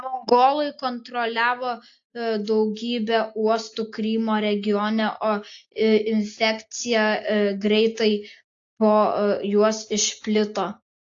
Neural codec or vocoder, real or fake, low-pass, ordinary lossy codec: none; real; 7.2 kHz; AAC, 48 kbps